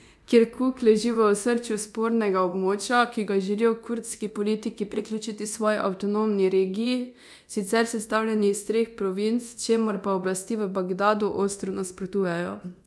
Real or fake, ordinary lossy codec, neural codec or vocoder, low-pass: fake; none; codec, 24 kHz, 0.9 kbps, DualCodec; none